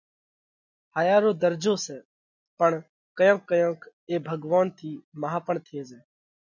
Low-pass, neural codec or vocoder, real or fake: 7.2 kHz; none; real